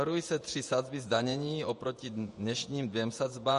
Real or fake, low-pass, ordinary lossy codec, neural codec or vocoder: fake; 14.4 kHz; MP3, 48 kbps; vocoder, 48 kHz, 128 mel bands, Vocos